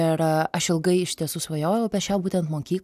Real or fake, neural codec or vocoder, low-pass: real; none; 14.4 kHz